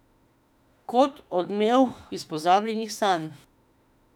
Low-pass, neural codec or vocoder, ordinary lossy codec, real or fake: 19.8 kHz; autoencoder, 48 kHz, 32 numbers a frame, DAC-VAE, trained on Japanese speech; none; fake